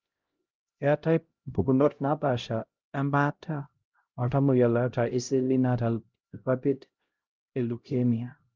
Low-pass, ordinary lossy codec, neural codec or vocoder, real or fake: 7.2 kHz; Opus, 24 kbps; codec, 16 kHz, 0.5 kbps, X-Codec, HuBERT features, trained on LibriSpeech; fake